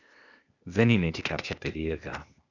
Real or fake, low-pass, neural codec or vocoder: fake; 7.2 kHz; codec, 16 kHz, 0.8 kbps, ZipCodec